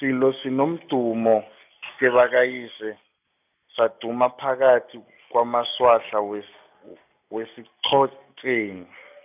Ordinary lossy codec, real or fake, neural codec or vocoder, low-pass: none; real; none; 3.6 kHz